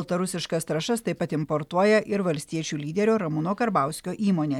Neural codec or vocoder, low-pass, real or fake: none; 19.8 kHz; real